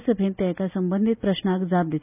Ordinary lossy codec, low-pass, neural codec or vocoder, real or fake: none; 3.6 kHz; none; real